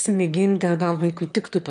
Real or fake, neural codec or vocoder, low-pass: fake; autoencoder, 22.05 kHz, a latent of 192 numbers a frame, VITS, trained on one speaker; 9.9 kHz